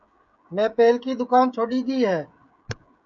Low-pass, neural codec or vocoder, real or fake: 7.2 kHz; codec, 16 kHz, 16 kbps, FreqCodec, smaller model; fake